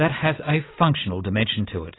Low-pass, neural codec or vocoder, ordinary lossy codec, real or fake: 7.2 kHz; none; AAC, 16 kbps; real